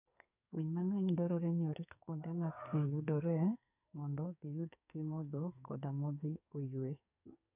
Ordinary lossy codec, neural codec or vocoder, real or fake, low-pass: none; codec, 44.1 kHz, 2.6 kbps, SNAC; fake; 3.6 kHz